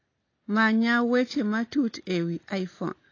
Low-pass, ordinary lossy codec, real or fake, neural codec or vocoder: 7.2 kHz; AAC, 32 kbps; real; none